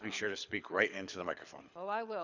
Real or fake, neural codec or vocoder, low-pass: fake; codec, 24 kHz, 6 kbps, HILCodec; 7.2 kHz